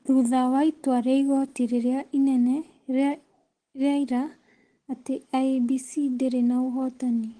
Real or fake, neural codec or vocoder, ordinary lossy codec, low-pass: real; none; Opus, 16 kbps; 9.9 kHz